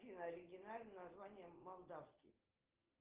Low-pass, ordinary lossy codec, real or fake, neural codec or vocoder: 3.6 kHz; Opus, 16 kbps; real; none